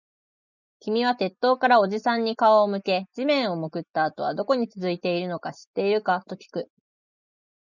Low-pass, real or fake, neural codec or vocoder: 7.2 kHz; real; none